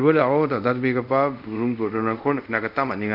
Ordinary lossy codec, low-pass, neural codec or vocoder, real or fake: none; 5.4 kHz; codec, 24 kHz, 0.5 kbps, DualCodec; fake